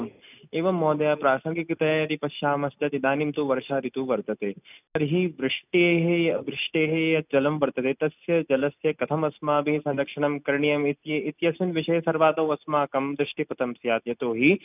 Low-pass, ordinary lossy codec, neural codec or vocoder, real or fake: 3.6 kHz; none; none; real